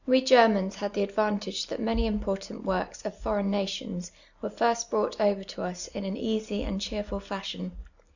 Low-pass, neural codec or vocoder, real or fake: 7.2 kHz; none; real